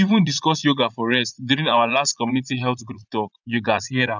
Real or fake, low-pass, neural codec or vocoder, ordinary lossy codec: fake; 7.2 kHz; vocoder, 44.1 kHz, 80 mel bands, Vocos; none